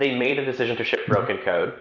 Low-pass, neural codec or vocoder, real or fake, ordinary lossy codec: 7.2 kHz; vocoder, 44.1 kHz, 128 mel bands every 256 samples, BigVGAN v2; fake; MP3, 64 kbps